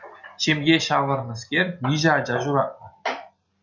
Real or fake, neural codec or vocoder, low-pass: real; none; 7.2 kHz